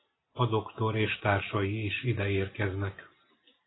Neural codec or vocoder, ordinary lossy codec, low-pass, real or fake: none; AAC, 16 kbps; 7.2 kHz; real